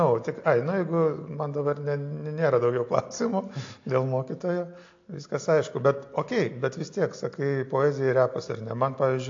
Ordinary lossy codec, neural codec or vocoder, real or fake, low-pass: AAC, 48 kbps; none; real; 7.2 kHz